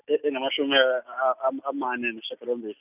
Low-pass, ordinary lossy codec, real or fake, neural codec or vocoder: 3.6 kHz; none; real; none